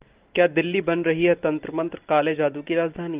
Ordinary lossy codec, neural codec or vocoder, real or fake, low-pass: Opus, 24 kbps; none; real; 3.6 kHz